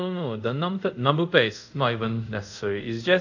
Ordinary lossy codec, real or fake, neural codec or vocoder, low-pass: none; fake; codec, 24 kHz, 0.5 kbps, DualCodec; 7.2 kHz